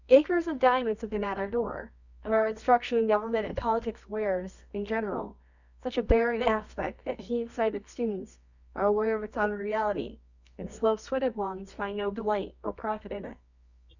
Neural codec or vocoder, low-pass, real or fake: codec, 24 kHz, 0.9 kbps, WavTokenizer, medium music audio release; 7.2 kHz; fake